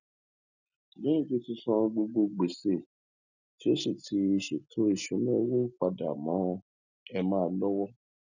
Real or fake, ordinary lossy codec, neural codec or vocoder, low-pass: real; none; none; 7.2 kHz